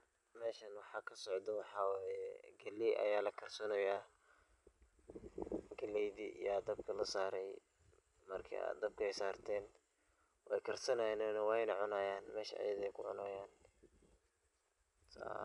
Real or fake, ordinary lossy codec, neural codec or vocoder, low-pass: real; none; none; 10.8 kHz